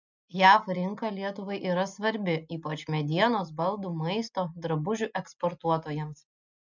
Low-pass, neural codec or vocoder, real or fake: 7.2 kHz; none; real